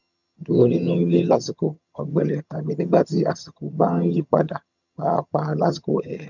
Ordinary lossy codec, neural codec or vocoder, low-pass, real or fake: none; vocoder, 22.05 kHz, 80 mel bands, HiFi-GAN; 7.2 kHz; fake